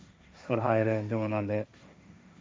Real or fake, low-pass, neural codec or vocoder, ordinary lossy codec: fake; none; codec, 16 kHz, 1.1 kbps, Voila-Tokenizer; none